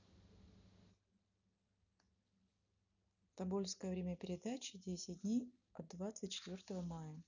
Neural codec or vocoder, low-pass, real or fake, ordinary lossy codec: none; 7.2 kHz; real; none